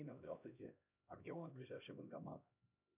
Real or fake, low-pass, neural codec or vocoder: fake; 3.6 kHz; codec, 16 kHz, 1 kbps, X-Codec, HuBERT features, trained on LibriSpeech